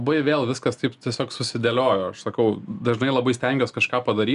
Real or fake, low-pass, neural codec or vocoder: real; 10.8 kHz; none